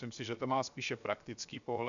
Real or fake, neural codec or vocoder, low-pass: fake; codec, 16 kHz, 0.7 kbps, FocalCodec; 7.2 kHz